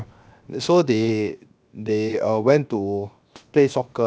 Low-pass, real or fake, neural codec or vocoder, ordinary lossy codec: none; fake; codec, 16 kHz, 0.7 kbps, FocalCodec; none